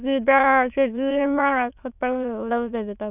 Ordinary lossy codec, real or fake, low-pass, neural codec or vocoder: none; fake; 3.6 kHz; autoencoder, 22.05 kHz, a latent of 192 numbers a frame, VITS, trained on many speakers